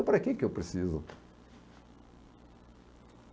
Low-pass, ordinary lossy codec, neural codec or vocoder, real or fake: none; none; none; real